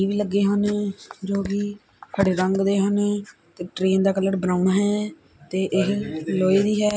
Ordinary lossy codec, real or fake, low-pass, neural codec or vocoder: none; real; none; none